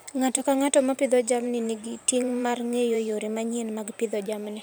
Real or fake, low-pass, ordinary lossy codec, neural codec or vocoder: fake; none; none; vocoder, 44.1 kHz, 128 mel bands every 512 samples, BigVGAN v2